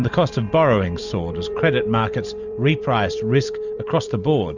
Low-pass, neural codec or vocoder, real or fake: 7.2 kHz; vocoder, 44.1 kHz, 128 mel bands every 256 samples, BigVGAN v2; fake